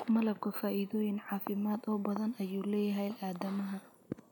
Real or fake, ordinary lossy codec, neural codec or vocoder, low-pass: real; none; none; none